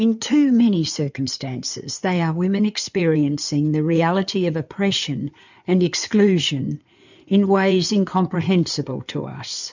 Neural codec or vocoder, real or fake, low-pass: codec, 16 kHz in and 24 kHz out, 2.2 kbps, FireRedTTS-2 codec; fake; 7.2 kHz